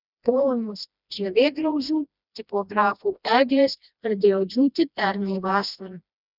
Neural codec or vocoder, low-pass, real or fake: codec, 16 kHz, 1 kbps, FreqCodec, smaller model; 5.4 kHz; fake